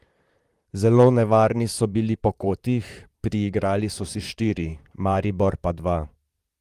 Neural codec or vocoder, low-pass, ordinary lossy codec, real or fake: vocoder, 44.1 kHz, 128 mel bands, Pupu-Vocoder; 14.4 kHz; Opus, 24 kbps; fake